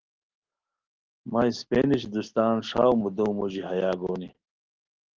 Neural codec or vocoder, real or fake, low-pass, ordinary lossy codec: none; real; 7.2 kHz; Opus, 16 kbps